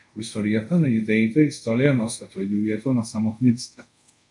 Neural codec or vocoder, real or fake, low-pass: codec, 24 kHz, 0.5 kbps, DualCodec; fake; 10.8 kHz